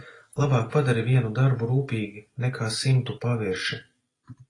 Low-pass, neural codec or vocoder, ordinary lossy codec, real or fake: 10.8 kHz; none; AAC, 32 kbps; real